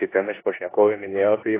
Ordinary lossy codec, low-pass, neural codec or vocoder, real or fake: AAC, 16 kbps; 3.6 kHz; codec, 16 kHz in and 24 kHz out, 0.9 kbps, LongCat-Audio-Codec, four codebook decoder; fake